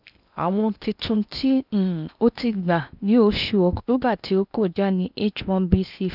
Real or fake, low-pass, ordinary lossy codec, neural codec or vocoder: fake; 5.4 kHz; none; codec, 16 kHz in and 24 kHz out, 0.8 kbps, FocalCodec, streaming, 65536 codes